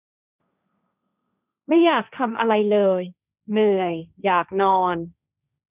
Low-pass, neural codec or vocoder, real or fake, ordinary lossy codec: 3.6 kHz; codec, 16 kHz, 1.1 kbps, Voila-Tokenizer; fake; none